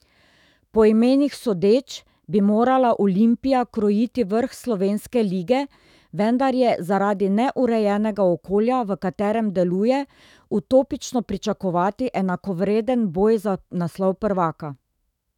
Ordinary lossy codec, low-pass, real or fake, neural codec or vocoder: none; 19.8 kHz; fake; autoencoder, 48 kHz, 128 numbers a frame, DAC-VAE, trained on Japanese speech